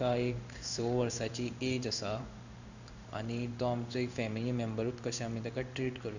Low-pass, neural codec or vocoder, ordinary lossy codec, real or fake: 7.2 kHz; codec, 16 kHz in and 24 kHz out, 1 kbps, XY-Tokenizer; none; fake